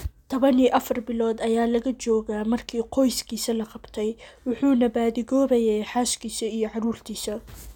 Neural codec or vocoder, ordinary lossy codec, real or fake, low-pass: none; none; real; 19.8 kHz